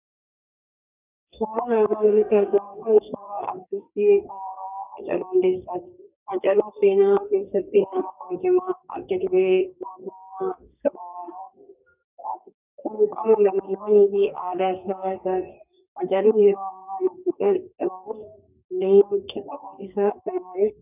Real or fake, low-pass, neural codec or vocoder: fake; 3.6 kHz; codec, 32 kHz, 1.9 kbps, SNAC